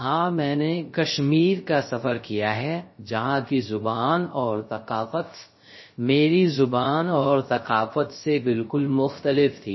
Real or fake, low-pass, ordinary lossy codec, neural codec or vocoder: fake; 7.2 kHz; MP3, 24 kbps; codec, 16 kHz, 0.3 kbps, FocalCodec